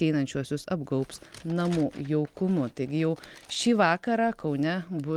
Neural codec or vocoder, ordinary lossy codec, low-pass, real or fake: none; Opus, 32 kbps; 19.8 kHz; real